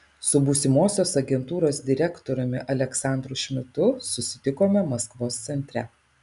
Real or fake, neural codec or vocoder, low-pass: real; none; 10.8 kHz